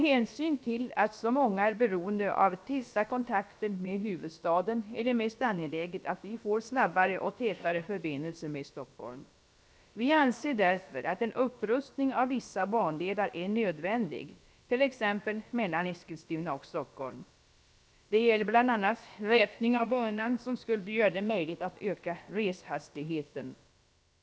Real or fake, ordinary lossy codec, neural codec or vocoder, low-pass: fake; none; codec, 16 kHz, about 1 kbps, DyCAST, with the encoder's durations; none